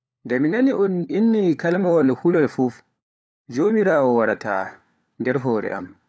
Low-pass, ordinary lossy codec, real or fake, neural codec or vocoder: none; none; fake; codec, 16 kHz, 4 kbps, FunCodec, trained on LibriTTS, 50 frames a second